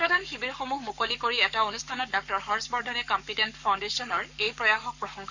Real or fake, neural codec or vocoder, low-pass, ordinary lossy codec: fake; codec, 44.1 kHz, 7.8 kbps, Pupu-Codec; 7.2 kHz; none